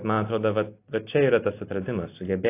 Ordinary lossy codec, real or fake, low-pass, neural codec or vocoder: AAC, 24 kbps; real; 3.6 kHz; none